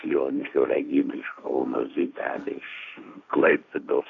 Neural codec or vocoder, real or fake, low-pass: codec, 16 kHz, 1.1 kbps, Voila-Tokenizer; fake; 7.2 kHz